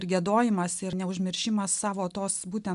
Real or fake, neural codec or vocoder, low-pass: real; none; 10.8 kHz